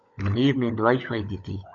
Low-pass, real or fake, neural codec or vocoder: 7.2 kHz; fake; codec, 16 kHz, 16 kbps, FunCodec, trained on LibriTTS, 50 frames a second